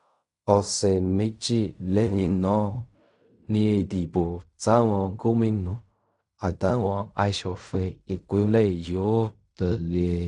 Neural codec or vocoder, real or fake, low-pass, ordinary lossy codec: codec, 16 kHz in and 24 kHz out, 0.4 kbps, LongCat-Audio-Codec, fine tuned four codebook decoder; fake; 10.8 kHz; none